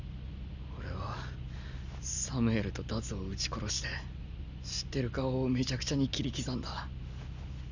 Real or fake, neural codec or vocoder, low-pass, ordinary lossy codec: real; none; 7.2 kHz; none